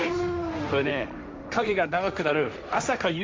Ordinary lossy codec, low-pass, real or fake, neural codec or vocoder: none; none; fake; codec, 16 kHz, 1.1 kbps, Voila-Tokenizer